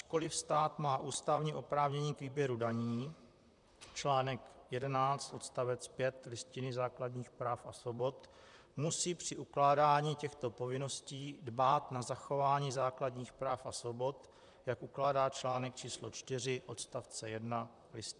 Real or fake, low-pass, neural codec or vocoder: fake; 10.8 kHz; vocoder, 44.1 kHz, 128 mel bands, Pupu-Vocoder